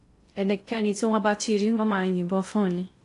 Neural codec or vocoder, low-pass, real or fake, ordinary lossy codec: codec, 16 kHz in and 24 kHz out, 0.6 kbps, FocalCodec, streaming, 2048 codes; 10.8 kHz; fake; AAC, 48 kbps